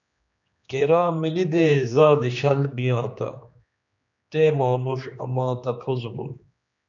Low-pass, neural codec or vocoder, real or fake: 7.2 kHz; codec, 16 kHz, 2 kbps, X-Codec, HuBERT features, trained on general audio; fake